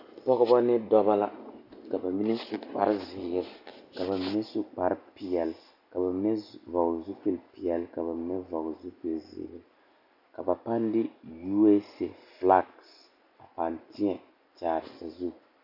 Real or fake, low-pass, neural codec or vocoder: real; 5.4 kHz; none